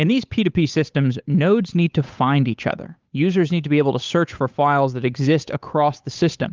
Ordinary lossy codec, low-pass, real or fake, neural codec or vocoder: Opus, 24 kbps; 7.2 kHz; fake; codec, 16 kHz, 8 kbps, FunCodec, trained on Chinese and English, 25 frames a second